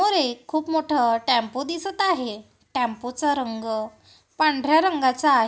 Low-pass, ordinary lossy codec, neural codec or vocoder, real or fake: none; none; none; real